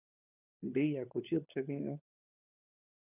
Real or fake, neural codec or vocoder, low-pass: fake; codec, 16 kHz, 16 kbps, FunCodec, trained on LibriTTS, 50 frames a second; 3.6 kHz